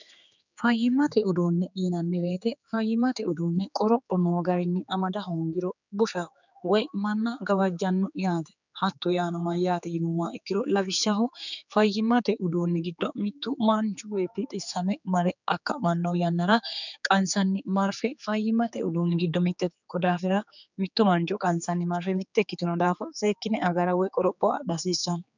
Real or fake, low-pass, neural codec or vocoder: fake; 7.2 kHz; codec, 16 kHz, 4 kbps, X-Codec, HuBERT features, trained on general audio